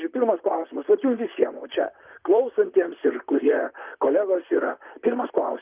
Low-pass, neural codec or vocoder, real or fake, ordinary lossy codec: 3.6 kHz; vocoder, 44.1 kHz, 128 mel bands, Pupu-Vocoder; fake; Opus, 24 kbps